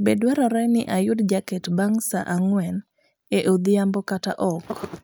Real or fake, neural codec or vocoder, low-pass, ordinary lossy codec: real; none; none; none